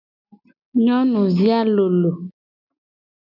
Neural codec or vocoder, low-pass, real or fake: none; 5.4 kHz; real